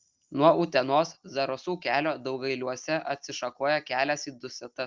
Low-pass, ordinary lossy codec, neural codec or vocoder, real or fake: 7.2 kHz; Opus, 32 kbps; none; real